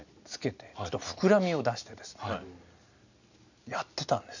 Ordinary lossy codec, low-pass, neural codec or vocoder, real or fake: none; 7.2 kHz; none; real